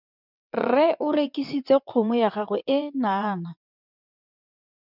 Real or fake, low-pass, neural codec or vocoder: fake; 5.4 kHz; vocoder, 22.05 kHz, 80 mel bands, WaveNeXt